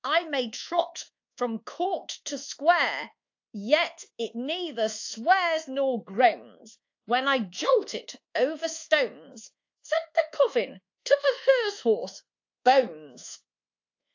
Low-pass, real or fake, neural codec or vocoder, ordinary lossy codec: 7.2 kHz; fake; codec, 24 kHz, 1.2 kbps, DualCodec; AAC, 48 kbps